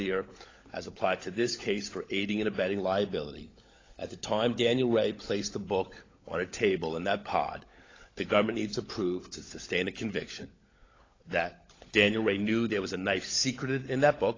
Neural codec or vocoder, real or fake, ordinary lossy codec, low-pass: codec, 16 kHz, 16 kbps, FunCodec, trained on LibriTTS, 50 frames a second; fake; AAC, 32 kbps; 7.2 kHz